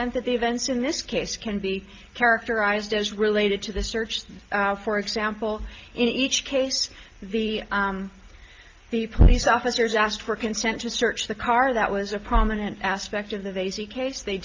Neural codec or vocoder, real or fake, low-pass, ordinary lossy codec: none; real; 7.2 kHz; Opus, 24 kbps